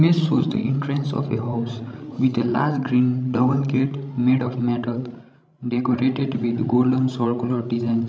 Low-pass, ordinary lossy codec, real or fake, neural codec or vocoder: none; none; fake; codec, 16 kHz, 16 kbps, FreqCodec, larger model